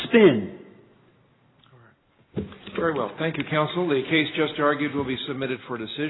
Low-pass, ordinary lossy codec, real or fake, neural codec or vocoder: 7.2 kHz; AAC, 16 kbps; real; none